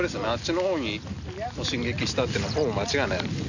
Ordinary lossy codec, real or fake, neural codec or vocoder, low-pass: none; real; none; 7.2 kHz